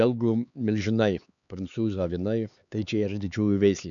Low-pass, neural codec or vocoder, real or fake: 7.2 kHz; codec, 16 kHz, 4 kbps, X-Codec, HuBERT features, trained on LibriSpeech; fake